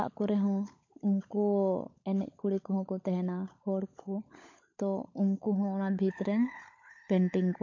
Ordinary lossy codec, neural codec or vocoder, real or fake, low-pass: MP3, 48 kbps; codec, 16 kHz, 16 kbps, FunCodec, trained on LibriTTS, 50 frames a second; fake; 7.2 kHz